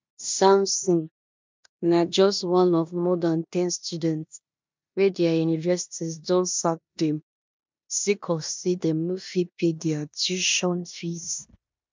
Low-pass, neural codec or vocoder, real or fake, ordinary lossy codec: 7.2 kHz; codec, 16 kHz in and 24 kHz out, 0.9 kbps, LongCat-Audio-Codec, four codebook decoder; fake; MP3, 64 kbps